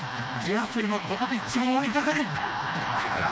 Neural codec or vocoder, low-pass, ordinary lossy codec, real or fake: codec, 16 kHz, 1 kbps, FreqCodec, smaller model; none; none; fake